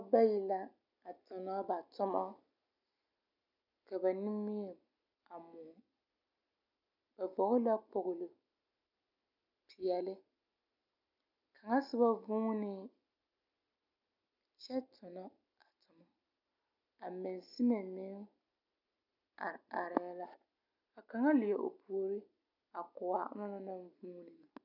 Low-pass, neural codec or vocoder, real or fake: 5.4 kHz; none; real